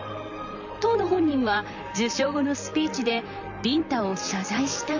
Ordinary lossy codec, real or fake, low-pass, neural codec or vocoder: none; fake; 7.2 kHz; vocoder, 44.1 kHz, 128 mel bands, Pupu-Vocoder